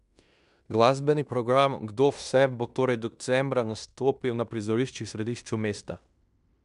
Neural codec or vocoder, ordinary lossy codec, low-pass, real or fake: codec, 16 kHz in and 24 kHz out, 0.9 kbps, LongCat-Audio-Codec, fine tuned four codebook decoder; none; 10.8 kHz; fake